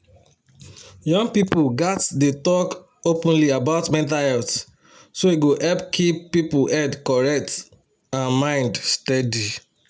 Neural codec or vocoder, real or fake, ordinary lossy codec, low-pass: none; real; none; none